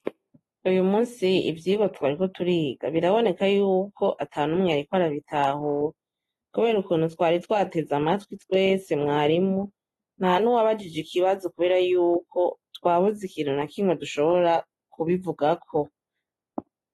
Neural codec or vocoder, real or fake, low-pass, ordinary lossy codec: none; real; 19.8 kHz; AAC, 32 kbps